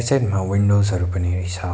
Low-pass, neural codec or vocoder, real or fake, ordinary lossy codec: none; none; real; none